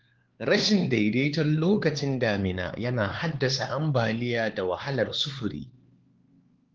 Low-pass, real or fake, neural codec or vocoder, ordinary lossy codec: 7.2 kHz; fake; codec, 16 kHz, 4 kbps, X-Codec, HuBERT features, trained on LibriSpeech; Opus, 16 kbps